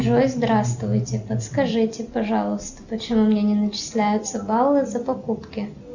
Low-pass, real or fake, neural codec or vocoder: 7.2 kHz; real; none